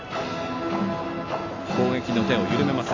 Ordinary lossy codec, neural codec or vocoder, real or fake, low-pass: AAC, 32 kbps; none; real; 7.2 kHz